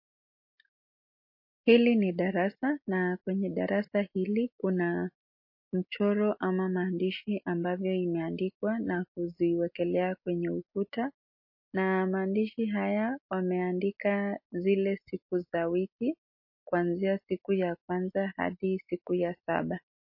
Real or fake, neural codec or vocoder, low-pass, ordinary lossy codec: real; none; 5.4 kHz; MP3, 32 kbps